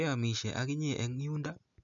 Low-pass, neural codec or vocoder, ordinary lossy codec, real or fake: 7.2 kHz; none; none; real